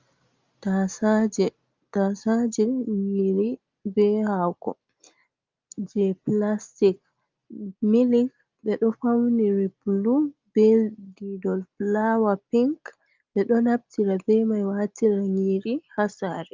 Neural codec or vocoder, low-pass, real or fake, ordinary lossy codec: none; 7.2 kHz; real; Opus, 32 kbps